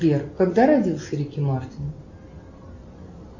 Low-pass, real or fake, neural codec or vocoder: 7.2 kHz; real; none